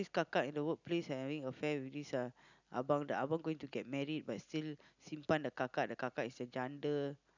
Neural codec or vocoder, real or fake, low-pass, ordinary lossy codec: none; real; 7.2 kHz; none